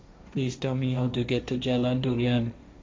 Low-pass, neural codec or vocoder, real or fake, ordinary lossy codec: none; codec, 16 kHz, 1.1 kbps, Voila-Tokenizer; fake; none